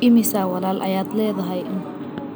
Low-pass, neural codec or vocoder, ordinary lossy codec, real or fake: none; none; none; real